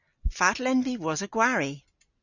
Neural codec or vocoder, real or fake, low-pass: none; real; 7.2 kHz